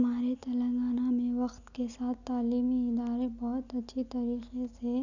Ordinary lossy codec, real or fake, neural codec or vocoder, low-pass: none; real; none; 7.2 kHz